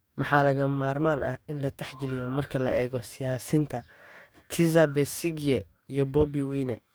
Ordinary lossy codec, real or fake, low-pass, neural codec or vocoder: none; fake; none; codec, 44.1 kHz, 2.6 kbps, DAC